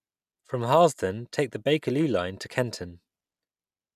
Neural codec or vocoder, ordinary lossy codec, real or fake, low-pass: none; none; real; 14.4 kHz